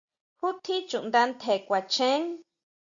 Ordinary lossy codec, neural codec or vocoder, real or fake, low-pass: Opus, 64 kbps; none; real; 7.2 kHz